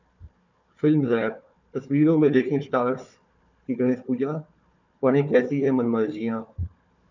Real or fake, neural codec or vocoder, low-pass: fake; codec, 16 kHz, 4 kbps, FunCodec, trained on Chinese and English, 50 frames a second; 7.2 kHz